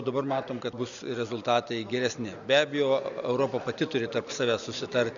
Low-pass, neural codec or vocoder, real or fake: 7.2 kHz; none; real